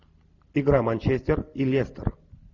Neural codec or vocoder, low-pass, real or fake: none; 7.2 kHz; real